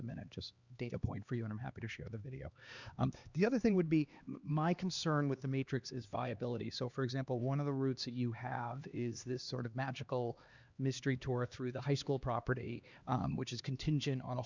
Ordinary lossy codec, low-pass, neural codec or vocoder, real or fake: Opus, 64 kbps; 7.2 kHz; codec, 16 kHz, 2 kbps, X-Codec, HuBERT features, trained on LibriSpeech; fake